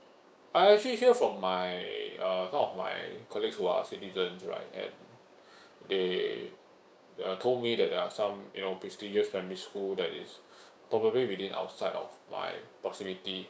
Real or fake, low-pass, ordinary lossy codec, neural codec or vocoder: fake; none; none; codec, 16 kHz, 6 kbps, DAC